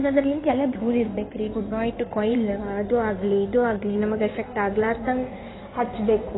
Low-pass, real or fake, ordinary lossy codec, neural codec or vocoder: 7.2 kHz; fake; AAC, 16 kbps; codec, 16 kHz in and 24 kHz out, 2.2 kbps, FireRedTTS-2 codec